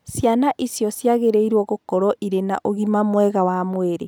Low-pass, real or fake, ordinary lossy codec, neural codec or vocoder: none; real; none; none